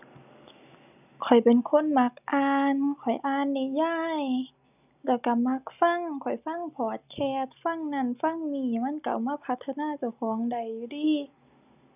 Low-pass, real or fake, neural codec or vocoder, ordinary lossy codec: 3.6 kHz; real; none; none